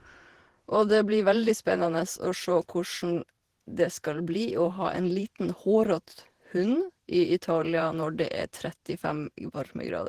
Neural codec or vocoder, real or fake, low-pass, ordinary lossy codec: vocoder, 48 kHz, 128 mel bands, Vocos; fake; 14.4 kHz; Opus, 16 kbps